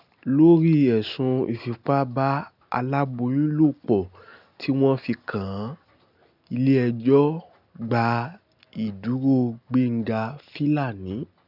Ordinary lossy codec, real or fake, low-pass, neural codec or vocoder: none; real; 5.4 kHz; none